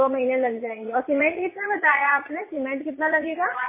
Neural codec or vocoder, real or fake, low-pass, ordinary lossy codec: vocoder, 22.05 kHz, 80 mel bands, Vocos; fake; 3.6 kHz; MP3, 16 kbps